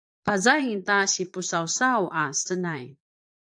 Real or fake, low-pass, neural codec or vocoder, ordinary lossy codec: fake; 9.9 kHz; vocoder, 22.05 kHz, 80 mel bands, Vocos; AAC, 64 kbps